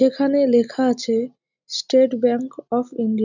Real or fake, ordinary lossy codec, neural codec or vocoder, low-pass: real; none; none; 7.2 kHz